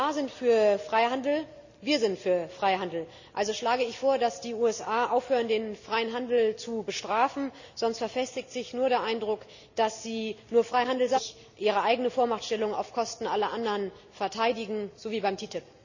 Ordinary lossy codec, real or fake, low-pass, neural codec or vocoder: none; real; 7.2 kHz; none